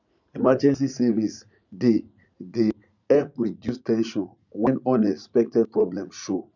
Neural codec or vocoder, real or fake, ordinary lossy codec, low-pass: vocoder, 44.1 kHz, 80 mel bands, Vocos; fake; none; 7.2 kHz